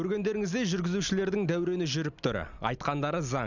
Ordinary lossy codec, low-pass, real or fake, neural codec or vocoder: none; 7.2 kHz; real; none